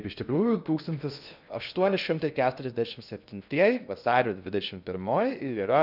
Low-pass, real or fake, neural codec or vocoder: 5.4 kHz; fake; codec, 16 kHz in and 24 kHz out, 0.6 kbps, FocalCodec, streaming, 2048 codes